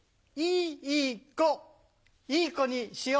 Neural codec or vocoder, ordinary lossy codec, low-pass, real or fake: none; none; none; real